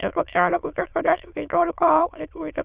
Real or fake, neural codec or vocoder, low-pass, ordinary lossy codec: fake; autoencoder, 22.05 kHz, a latent of 192 numbers a frame, VITS, trained on many speakers; 3.6 kHz; Opus, 64 kbps